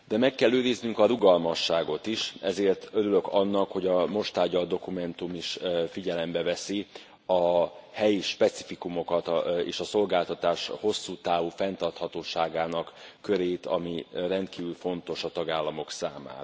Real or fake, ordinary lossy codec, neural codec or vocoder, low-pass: real; none; none; none